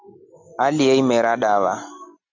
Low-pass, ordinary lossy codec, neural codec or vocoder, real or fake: 7.2 kHz; MP3, 64 kbps; none; real